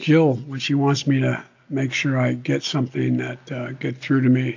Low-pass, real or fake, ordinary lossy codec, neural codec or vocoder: 7.2 kHz; real; AAC, 48 kbps; none